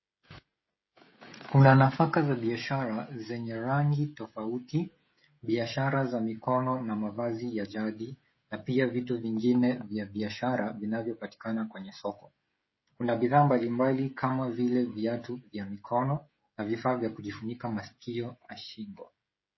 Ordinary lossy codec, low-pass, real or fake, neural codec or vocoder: MP3, 24 kbps; 7.2 kHz; fake; codec, 16 kHz, 16 kbps, FreqCodec, smaller model